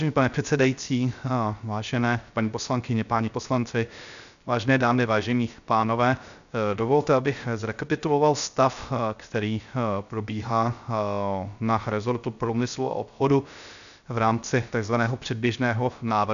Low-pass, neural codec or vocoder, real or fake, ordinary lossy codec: 7.2 kHz; codec, 16 kHz, 0.3 kbps, FocalCodec; fake; MP3, 96 kbps